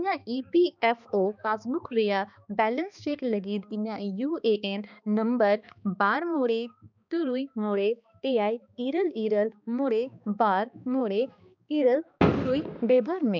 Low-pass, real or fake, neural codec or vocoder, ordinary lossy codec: 7.2 kHz; fake; codec, 16 kHz, 2 kbps, X-Codec, HuBERT features, trained on balanced general audio; none